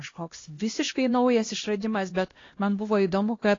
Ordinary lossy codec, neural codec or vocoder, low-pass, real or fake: AAC, 32 kbps; codec, 16 kHz, 1 kbps, X-Codec, HuBERT features, trained on LibriSpeech; 7.2 kHz; fake